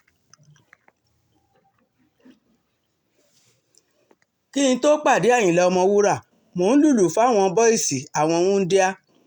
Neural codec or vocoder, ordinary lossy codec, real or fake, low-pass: none; none; real; 19.8 kHz